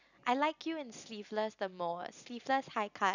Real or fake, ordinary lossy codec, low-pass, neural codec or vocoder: real; none; 7.2 kHz; none